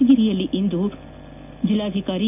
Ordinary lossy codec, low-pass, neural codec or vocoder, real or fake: AAC, 32 kbps; 3.6 kHz; none; real